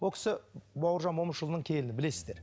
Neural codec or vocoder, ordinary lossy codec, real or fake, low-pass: none; none; real; none